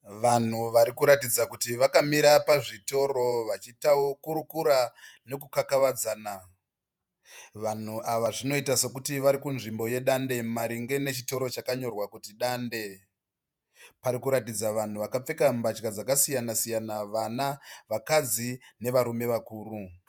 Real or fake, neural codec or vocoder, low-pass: fake; vocoder, 48 kHz, 128 mel bands, Vocos; 19.8 kHz